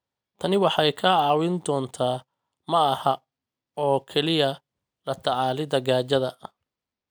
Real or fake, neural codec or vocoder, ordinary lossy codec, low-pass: real; none; none; none